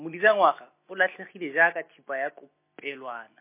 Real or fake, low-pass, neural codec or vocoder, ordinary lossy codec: real; 3.6 kHz; none; MP3, 24 kbps